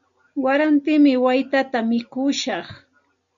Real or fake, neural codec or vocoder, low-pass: real; none; 7.2 kHz